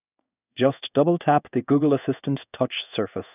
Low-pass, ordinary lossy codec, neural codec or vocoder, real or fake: 3.6 kHz; none; codec, 16 kHz in and 24 kHz out, 1 kbps, XY-Tokenizer; fake